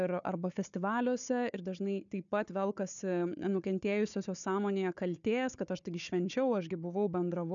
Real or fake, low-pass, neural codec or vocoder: fake; 7.2 kHz; codec, 16 kHz, 4 kbps, FunCodec, trained on Chinese and English, 50 frames a second